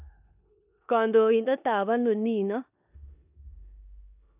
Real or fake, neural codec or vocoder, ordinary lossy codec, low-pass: fake; codec, 16 kHz in and 24 kHz out, 0.9 kbps, LongCat-Audio-Codec, four codebook decoder; none; 3.6 kHz